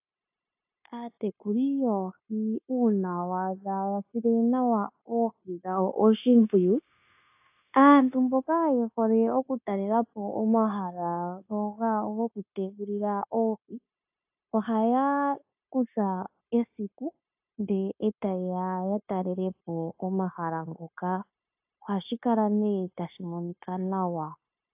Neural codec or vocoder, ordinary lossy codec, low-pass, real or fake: codec, 16 kHz, 0.9 kbps, LongCat-Audio-Codec; AAC, 32 kbps; 3.6 kHz; fake